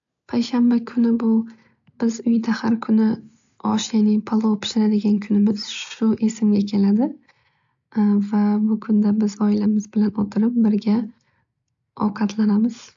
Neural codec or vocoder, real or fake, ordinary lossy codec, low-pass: none; real; AAC, 64 kbps; 7.2 kHz